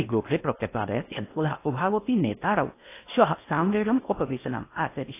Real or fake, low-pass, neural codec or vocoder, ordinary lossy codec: fake; 3.6 kHz; codec, 16 kHz in and 24 kHz out, 0.8 kbps, FocalCodec, streaming, 65536 codes; AAC, 24 kbps